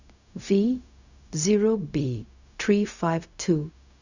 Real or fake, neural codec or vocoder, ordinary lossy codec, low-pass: fake; codec, 16 kHz, 0.4 kbps, LongCat-Audio-Codec; none; 7.2 kHz